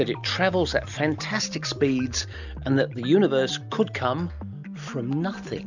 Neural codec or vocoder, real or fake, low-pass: none; real; 7.2 kHz